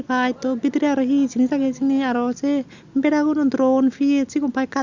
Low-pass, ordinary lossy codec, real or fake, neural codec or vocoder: 7.2 kHz; Opus, 64 kbps; real; none